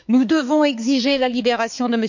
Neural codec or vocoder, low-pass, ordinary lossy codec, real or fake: codec, 16 kHz, 4 kbps, X-Codec, HuBERT features, trained on balanced general audio; 7.2 kHz; none; fake